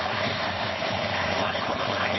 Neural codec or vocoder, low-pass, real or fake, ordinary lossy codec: codec, 16 kHz, 4.8 kbps, FACodec; 7.2 kHz; fake; MP3, 24 kbps